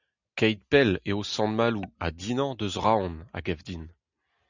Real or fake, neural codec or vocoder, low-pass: real; none; 7.2 kHz